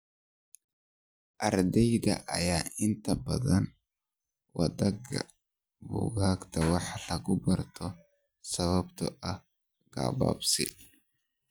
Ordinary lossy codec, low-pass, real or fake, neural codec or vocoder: none; none; real; none